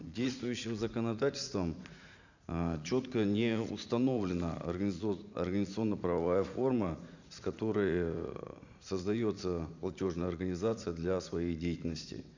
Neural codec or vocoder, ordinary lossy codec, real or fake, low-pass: vocoder, 44.1 kHz, 80 mel bands, Vocos; none; fake; 7.2 kHz